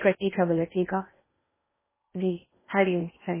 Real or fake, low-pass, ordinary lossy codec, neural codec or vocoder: fake; 3.6 kHz; MP3, 16 kbps; codec, 16 kHz in and 24 kHz out, 0.6 kbps, FocalCodec, streaming, 4096 codes